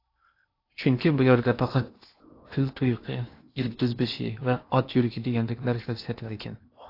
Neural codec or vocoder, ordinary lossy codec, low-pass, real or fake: codec, 16 kHz in and 24 kHz out, 0.8 kbps, FocalCodec, streaming, 65536 codes; AAC, 32 kbps; 5.4 kHz; fake